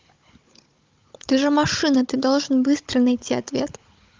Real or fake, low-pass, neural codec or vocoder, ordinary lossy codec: fake; 7.2 kHz; codec, 16 kHz, 16 kbps, FunCodec, trained on Chinese and English, 50 frames a second; Opus, 32 kbps